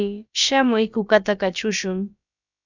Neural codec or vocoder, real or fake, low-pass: codec, 16 kHz, about 1 kbps, DyCAST, with the encoder's durations; fake; 7.2 kHz